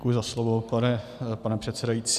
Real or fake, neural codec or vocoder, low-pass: real; none; 14.4 kHz